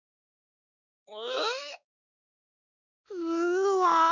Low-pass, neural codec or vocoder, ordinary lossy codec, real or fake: 7.2 kHz; codec, 16 kHz, 2 kbps, X-Codec, WavLM features, trained on Multilingual LibriSpeech; none; fake